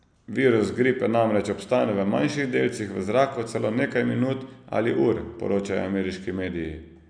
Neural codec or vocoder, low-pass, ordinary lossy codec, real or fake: none; 9.9 kHz; none; real